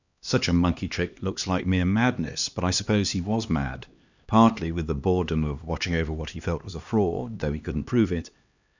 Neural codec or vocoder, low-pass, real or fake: codec, 16 kHz, 2 kbps, X-Codec, HuBERT features, trained on LibriSpeech; 7.2 kHz; fake